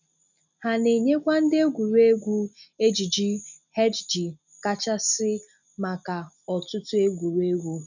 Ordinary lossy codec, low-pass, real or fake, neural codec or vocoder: none; 7.2 kHz; real; none